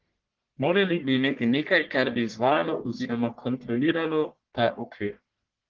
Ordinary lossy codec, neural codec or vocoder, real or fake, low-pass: Opus, 16 kbps; codec, 44.1 kHz, 1.7 kbps, Pupu-Codec; fake; 7.2 kHz